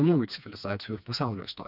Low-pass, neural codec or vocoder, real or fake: 5.4 kHz; codec, 16 kHz, 2 kbps, FreqCodec, smaller model; fake